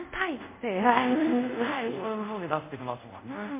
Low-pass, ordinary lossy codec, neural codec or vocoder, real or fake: 3.6 kHz; MP3, 24 kbps; codec, 24 kHz, 0.5 kbps, DualCodec; fake